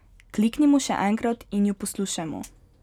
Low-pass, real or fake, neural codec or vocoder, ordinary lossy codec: 19.8 kHz; real; none; none